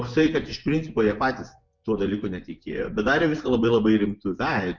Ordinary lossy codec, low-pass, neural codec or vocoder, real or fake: MP3, 64 kbps; 7.2 kHz; vocoder, 24 kHz, 100 mel bands, Vocos; fake